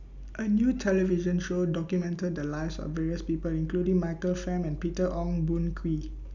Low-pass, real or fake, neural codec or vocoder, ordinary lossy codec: 7.2 kHz; real; none; none